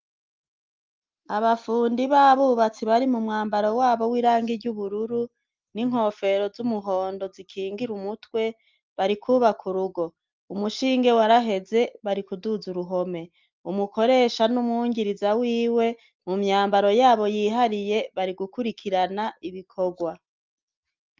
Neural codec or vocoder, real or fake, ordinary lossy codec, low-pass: none; real; Opus, 24 kbps; 7.2 kHz